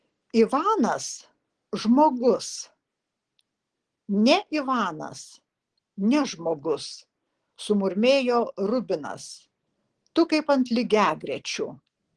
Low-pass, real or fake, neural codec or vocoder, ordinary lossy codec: 9.9 kHz; real; none; Opus, 16 kbps